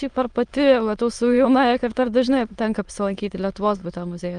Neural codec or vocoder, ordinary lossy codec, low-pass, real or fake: autoencoder, 22.05 kHz, a latent of 192 numbers a frame, VITS, trained on many speakers; Opus, 24 kbps; 9.9 kHz; fake